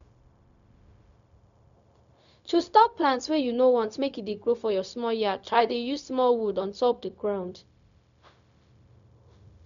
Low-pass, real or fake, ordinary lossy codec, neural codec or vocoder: 7.2 kHz; fake; none; codec, 16 kHz, 0.4 kbps, LongCat-Audio-Codec